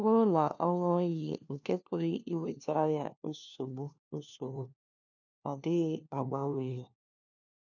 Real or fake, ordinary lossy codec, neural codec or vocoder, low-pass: fake; none; codec, 16 kHz, 1 kbps, FunCodec, trained on LibriTTS, 50 frames a second; 7.2 kHz